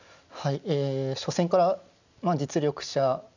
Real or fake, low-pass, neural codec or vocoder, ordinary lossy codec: real; 7.2 kHz; none; none